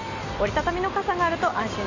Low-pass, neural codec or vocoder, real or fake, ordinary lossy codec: 7.2 kHz; none; real; none